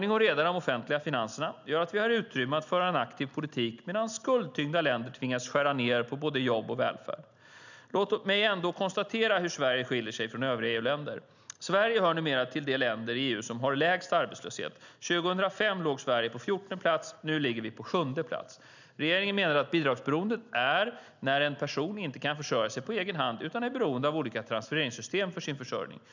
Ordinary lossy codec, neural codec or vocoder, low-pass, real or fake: none; none; 7.2 kHz; real